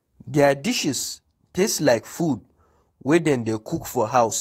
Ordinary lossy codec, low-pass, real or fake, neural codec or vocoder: AAC, 48 kbps; 19.8 kHz; fake; codec, 44.1 kHz, 7.8 kbps, DAC